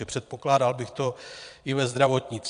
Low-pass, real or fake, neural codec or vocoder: 9.9 kHz; fake; vocoder, 22.05 kHz, 80 mel bands, Vocos